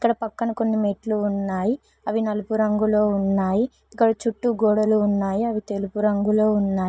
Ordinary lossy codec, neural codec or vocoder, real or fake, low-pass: none; none; real; none